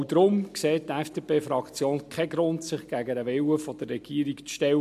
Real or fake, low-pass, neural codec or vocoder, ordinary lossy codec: real; 14.4 kHz; none; MP3, 64 kbps